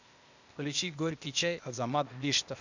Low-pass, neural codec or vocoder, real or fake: 7.2 kHz; codec, 16 kHz, 0.8 kbps, ZipCodec; fake